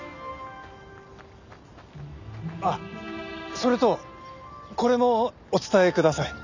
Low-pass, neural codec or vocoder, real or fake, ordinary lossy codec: 7.2 kHz; none; real; none